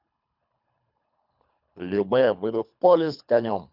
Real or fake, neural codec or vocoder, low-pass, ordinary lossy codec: fake; codec, 24 kHz, 3 kbps, HILCodec; 5.4 kHz; none